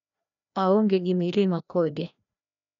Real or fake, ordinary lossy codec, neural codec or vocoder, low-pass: fake; none; codec, 16 kHz, 1 kbps, FreqCodec, larger model; 7.2 kHz